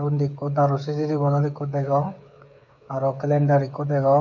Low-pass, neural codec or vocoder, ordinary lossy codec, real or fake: 7.2 kHz; codec, 16 kHz, 8 kbps, FreqCodec, smaller model; none; fake